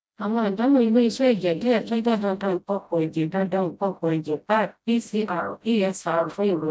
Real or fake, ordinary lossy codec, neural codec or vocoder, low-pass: fake; none; codec, 16 kHz, 0.5 kbps, FreqCodec, smaller model; none